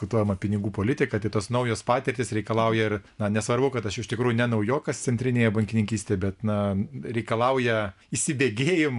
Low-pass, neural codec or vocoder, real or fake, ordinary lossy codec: 10.8 kHz; none; real; MP3, 96 kbps